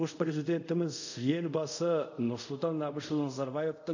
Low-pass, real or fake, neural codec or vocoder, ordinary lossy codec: 7.2 kHz; fake; codec, 24 kHz, 0.5 kbps, DualCodec; none